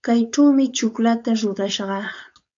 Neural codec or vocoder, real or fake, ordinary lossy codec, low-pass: codec, 16 kHz, 4.8 kbps, FACodec; fake; AAC, 64 kbps; 7.2 kHz